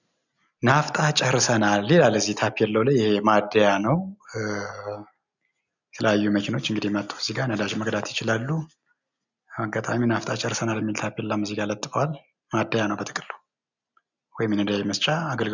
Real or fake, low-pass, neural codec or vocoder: real; 7.2 kHz; none